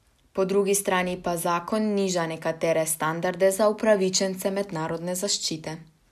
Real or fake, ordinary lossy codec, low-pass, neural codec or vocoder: real; none; 14.4 kHz; none